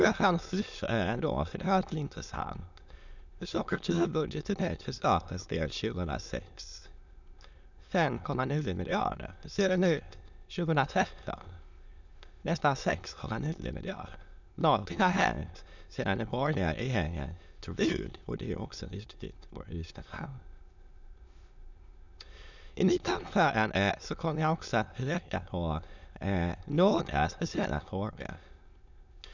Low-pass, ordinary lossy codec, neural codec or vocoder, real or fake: 7.2 kHz; none; autoencoder, 22.05 kHz, a latent of 192 numbers a frame, VITS, trained on many speakers; fake